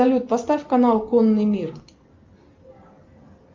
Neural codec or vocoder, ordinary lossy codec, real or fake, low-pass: none; Opus, 24 kbps; real; 7.2 kHz